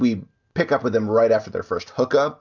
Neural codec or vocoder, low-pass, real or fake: none; 7.2 kHz; real